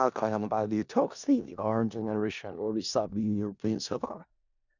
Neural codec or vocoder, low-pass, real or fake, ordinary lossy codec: codec, 16 kHz in and 24 kHz out, 0.4 kbps, LongCat-Audio-Codec, four codebook decoder; 7.2 kHz; fake; none